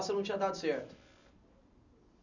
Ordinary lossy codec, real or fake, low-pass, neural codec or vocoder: none; real; 7.2 kHz; none